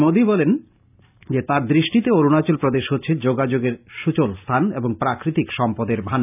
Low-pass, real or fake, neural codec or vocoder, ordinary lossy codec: 3.6 kHz; real; none; none